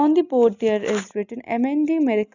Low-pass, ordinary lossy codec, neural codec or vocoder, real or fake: 7.2 kHz; none; none; real